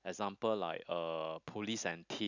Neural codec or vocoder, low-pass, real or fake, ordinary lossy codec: none; 7.2 kHz; real; none